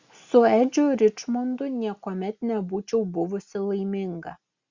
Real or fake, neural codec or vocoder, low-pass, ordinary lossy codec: real; none; 7.2 kHz; Opus, 64 kbps